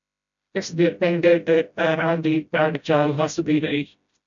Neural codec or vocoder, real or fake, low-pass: codec, 16 kHz, 0.5 kbps, FreqCodec, smaller model; fake; 7.2 kHz